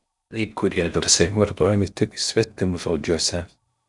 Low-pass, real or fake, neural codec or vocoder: 10.8 kHz; fake; codec, 16 kHz in and 24 kHz out, 0.6 kbps, FocalCodec, streaming, 4096 codes